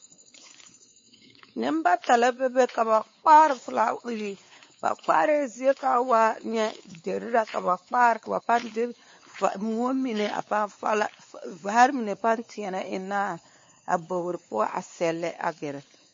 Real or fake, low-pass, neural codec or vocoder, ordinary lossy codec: fake; 7.2 kHz; codec, 16 kHz, 4 kbps, X-Codec, WavLM features, trained on Multilingual LibriSpeech; MP3, 32 kbps